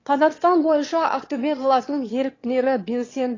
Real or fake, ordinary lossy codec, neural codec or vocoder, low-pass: fake; AAC, 32 kbps; autoencoder, 22.05 kHz, a latent of 192 numbers a frame, VITS, trained on one speaker; 7.2 kHz